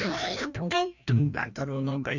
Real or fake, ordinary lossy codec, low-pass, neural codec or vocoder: fake; none; 7.2 kHz; codec, 16 kHz, 1 kbps, FreqCodec, larger model